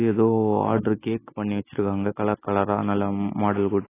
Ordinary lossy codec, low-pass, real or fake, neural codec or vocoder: AAC, 16 kbps; 3.6 kHz; real; none